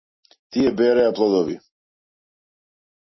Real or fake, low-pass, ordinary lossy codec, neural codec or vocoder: real; 7.2 kHz; MP3, 24 kbps; none